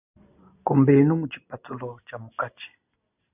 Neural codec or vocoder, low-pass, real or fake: none; 3.6 kHz; real